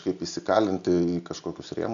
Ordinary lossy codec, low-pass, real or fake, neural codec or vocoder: AAC, 96 kbps; 7.2 kHz; real; none